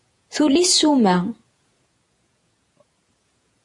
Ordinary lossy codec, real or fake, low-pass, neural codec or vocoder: AAC, 64 kbps; fake; 10.8 kHz; vocoder, 48 kHz, 128 mel bands, Vocos